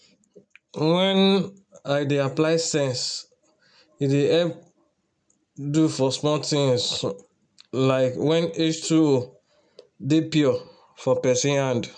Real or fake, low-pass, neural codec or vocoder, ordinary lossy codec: real; 9.9 kHz; none; none